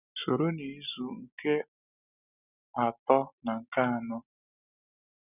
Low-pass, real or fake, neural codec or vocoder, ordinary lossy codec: 3.6 kHz; real; none; none